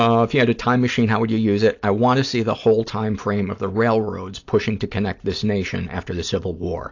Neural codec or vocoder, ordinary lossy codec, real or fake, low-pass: none; AAC, 48 kbps; real; 7.2 kHz